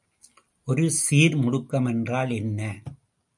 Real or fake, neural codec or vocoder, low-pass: real; none; 10.8 kHz